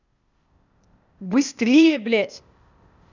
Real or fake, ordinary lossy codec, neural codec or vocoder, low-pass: fake; none; codec, 16 kHz, 0.8 kbps, ZipCodec; 7.2 kHz